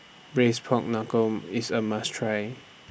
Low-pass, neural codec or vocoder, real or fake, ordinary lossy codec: none; none; real; none